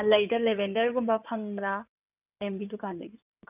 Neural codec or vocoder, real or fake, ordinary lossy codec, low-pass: codec, 16 kHz in and 24 kHz out, 2.2 kbps, FireRedTTS-2 codec; fake; none; 3.6 kHz